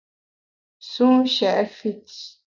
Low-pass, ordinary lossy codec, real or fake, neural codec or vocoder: 7.2 kHz; MP3, 64 kbps; real; none